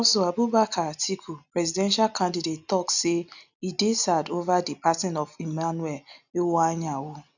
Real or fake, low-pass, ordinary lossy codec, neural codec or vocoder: real; 7.2 kHz; none; none